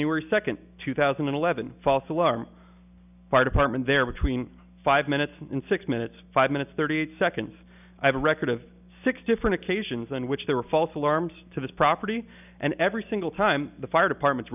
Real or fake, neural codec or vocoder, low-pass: real; none; 3.6 kHz